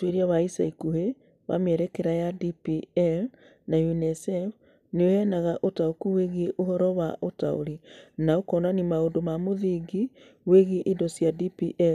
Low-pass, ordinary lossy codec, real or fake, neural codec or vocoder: 14.4 kHz; none; real; none